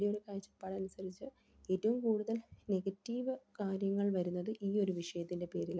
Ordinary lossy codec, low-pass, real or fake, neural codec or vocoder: none; none; real; none